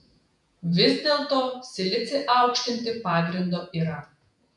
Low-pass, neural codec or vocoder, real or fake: 10.8 kHz; vocoder, 48 kHz, 128 mel bands, Vocos; fake